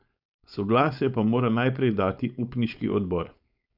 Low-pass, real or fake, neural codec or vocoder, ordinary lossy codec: 5.4 kHz; fake; codec, 16 kHz, 4.8 kbps, FACodec; none